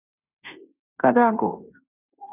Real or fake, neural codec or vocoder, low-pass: fake; codec, 16 kHz in and 24 kHz out, 0.9 kbps, LongCat-Audio-Codec, fine tuned four codebook decoder; 3.6 kHz